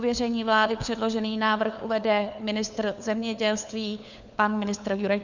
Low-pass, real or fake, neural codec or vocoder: 7.2 kHz; fake; codec, 16 kHz, 4 kbps, FunCodec, trained on LibriTTS, 50 frames a second